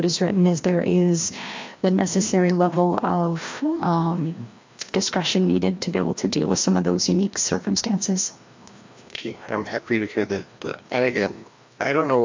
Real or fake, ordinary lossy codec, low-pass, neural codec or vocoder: fake; MP3, 48 kbps; 7.2 kHz; codec, 16 kHz, 1 kbps, FreqCodec, larger model